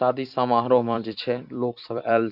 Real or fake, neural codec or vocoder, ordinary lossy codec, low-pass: fake; vocoder, 44.1 kHz, 128 mel bands every 256 samples, BigVGAN v2; none; 5.4 kHz